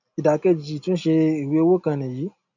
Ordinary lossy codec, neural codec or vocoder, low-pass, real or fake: none; none; 7.2 kHz; real